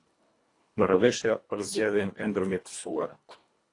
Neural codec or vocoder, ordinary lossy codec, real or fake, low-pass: codec, 24 kHz, 1.5 kbps, HILCodec; AAC, 48 kbps; fake; 10.8 kHz